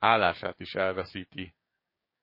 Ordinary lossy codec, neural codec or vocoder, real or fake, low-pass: MP3, 24 kbps; codec, 16 kHz, 6 kbps, DAC; fake; 5.4 kHz